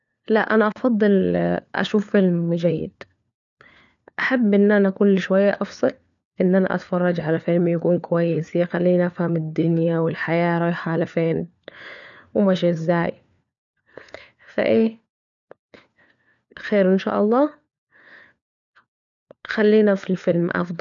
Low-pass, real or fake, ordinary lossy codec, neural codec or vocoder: 7.2 kHz; fake; none; codec, 16 kHz, 4 kbps, FunCodec, trained on LibriTTS, 50 frames a second